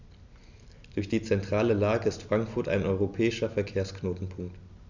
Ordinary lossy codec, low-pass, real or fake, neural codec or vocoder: none; 7.2 kHz; real; none